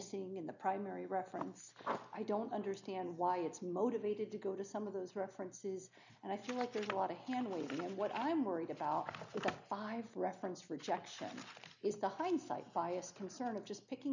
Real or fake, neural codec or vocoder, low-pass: real; none; 7.2 kHz